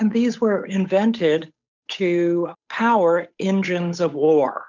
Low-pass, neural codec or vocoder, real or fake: 7.2 kHz; codec, 16 kHz, 8 kbps, FunCodec, trained on Chinese and English, 25 frames a second; fake